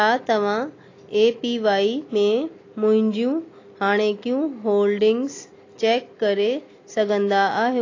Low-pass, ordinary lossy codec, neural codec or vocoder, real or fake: 7.2 kHz; AAC, 32 kbps; none; real